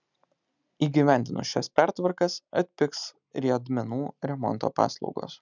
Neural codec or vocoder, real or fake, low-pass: none; real; 7.2 kHz